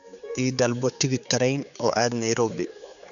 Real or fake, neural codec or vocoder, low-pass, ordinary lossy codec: fake; codec, 16 kHz, 4 kbps, X-Codec, HuBERT features, trained on general audio; 7.2 kHz; none